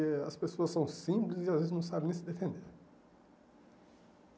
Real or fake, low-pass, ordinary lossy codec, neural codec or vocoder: real; none; none; none